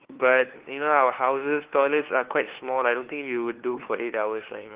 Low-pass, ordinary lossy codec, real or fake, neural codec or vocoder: 3.6 kHz; Opus, 16 kbps; fake; codec, 16 kHz, 2 kbps, FunCodec, trained on LibriTTS, 25 frames a second